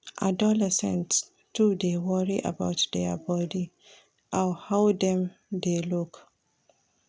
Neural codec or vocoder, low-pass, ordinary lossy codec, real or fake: none; none; none; real